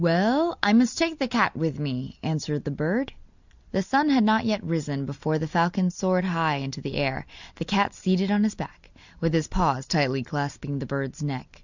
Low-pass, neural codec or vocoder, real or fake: 7.2 kHz; none; real